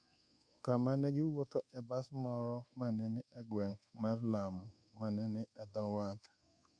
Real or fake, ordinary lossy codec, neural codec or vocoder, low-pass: fake; Opus, 64 kbps; codec, 24 kHz, 1.2 kbps, DualCodec; 9.9 kHz